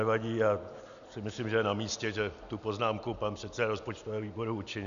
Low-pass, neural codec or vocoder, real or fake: 7.2 kHz; none; real